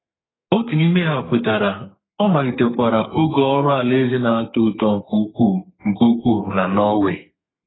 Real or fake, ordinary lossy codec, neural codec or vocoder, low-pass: fake; AAC, 16 kbps; codec, 32 kHz, 1.9 kbps, SNAC; 7.2 kHz